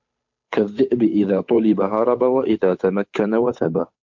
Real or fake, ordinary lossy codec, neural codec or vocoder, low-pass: fake; MP3, 48 kbps; codec, 16 kHz, 8 kbps, FunCodec, trained on Chinese and English, 25 frames a second; 7.2 kHz